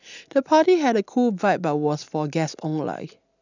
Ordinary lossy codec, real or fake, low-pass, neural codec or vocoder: none; real; 7.2 kHz; none